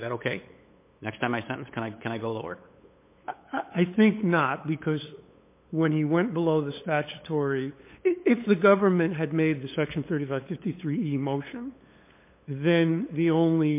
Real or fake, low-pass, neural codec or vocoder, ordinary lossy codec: fake; 3.6 kHz; codec, 16 kHz, 8 kbps, FunCodec, trained on LibriTTS, 25 frames a second; MP3, 24 kbps